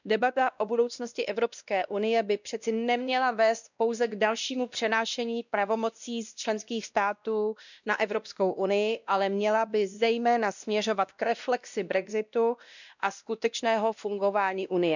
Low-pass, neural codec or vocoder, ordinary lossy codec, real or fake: 7.2 kHz; codec, 16 kHz, 1 kbps, X-Codec, WavLM features, trained on Multilingual LibriSpeech; none; fake